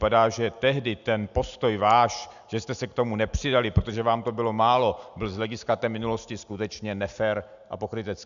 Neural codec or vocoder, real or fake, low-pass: none; real; 7.2 kHz